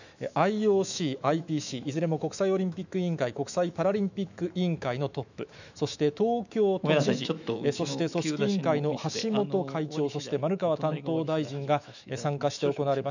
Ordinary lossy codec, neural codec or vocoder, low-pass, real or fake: none; autoencoder, 48 kHz, 128 numbers a frame, DAC-VAE, trained on Japanese speech; 7.2 kHz; fake